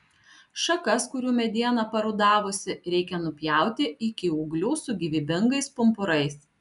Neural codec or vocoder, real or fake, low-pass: none; real; 10.8 kHz